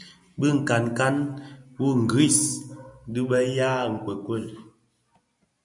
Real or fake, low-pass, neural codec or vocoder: real; 10.8 kHz; none